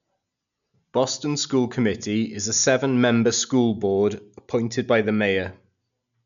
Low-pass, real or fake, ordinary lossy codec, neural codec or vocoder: 7.2 kHz; real; none; none